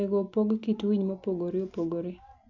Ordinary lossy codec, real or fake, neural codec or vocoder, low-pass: none; real; none; 7.2 kHz